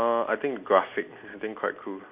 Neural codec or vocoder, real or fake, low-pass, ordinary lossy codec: none; real; 3.6 kHz; Opus, 64 kbps